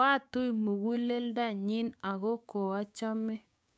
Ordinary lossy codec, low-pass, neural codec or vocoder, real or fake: none; none; codec, 16 kHz, 6 kbps, DAC; fake